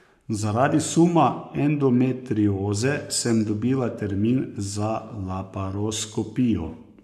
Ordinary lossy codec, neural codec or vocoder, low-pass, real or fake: none; codec, 44.1 kHz, 7.8 kbps, Pupu-Codec; 14.4 kHz; fake